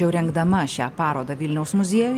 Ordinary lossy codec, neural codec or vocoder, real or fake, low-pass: Opus, 32 kbps; none; real; 14.4 kHz